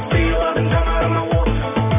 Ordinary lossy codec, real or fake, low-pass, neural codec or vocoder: none; real; 3.6 kHz; none